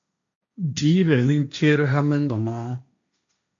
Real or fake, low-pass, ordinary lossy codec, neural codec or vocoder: fake; 7.2 kHz; AAC, 64 kbps; codec, 16 kHz, 1.1 kbps, Voila-Tokenizer